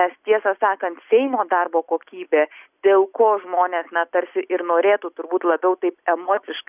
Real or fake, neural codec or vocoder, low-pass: real; none; 3.6 kHz